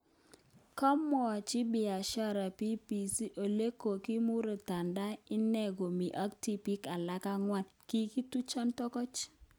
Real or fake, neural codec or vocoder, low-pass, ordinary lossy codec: real; none; none; none